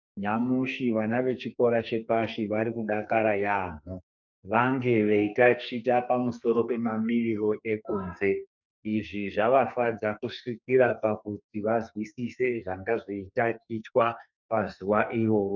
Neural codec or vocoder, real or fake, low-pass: codec, 44.1 kHz, 2.6 kbps, SNAC; fake; 7.2 kHz